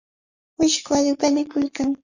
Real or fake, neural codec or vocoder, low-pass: fake; codec, 44.1 kHz, 7.8 kbps, Pupu-Codec; 7.2 kHz